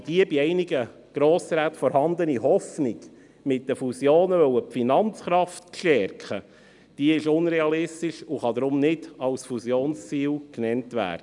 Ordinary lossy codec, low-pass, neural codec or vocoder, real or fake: none; 10.8 kHz; none; real